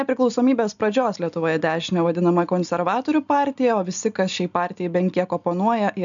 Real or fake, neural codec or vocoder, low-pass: real; none; 7.2 kHz